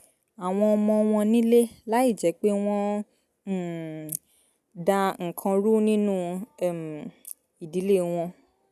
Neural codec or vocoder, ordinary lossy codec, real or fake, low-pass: none; none; real; 14.4 kHz